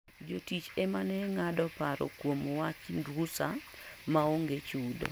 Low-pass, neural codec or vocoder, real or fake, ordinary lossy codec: none; none; real; none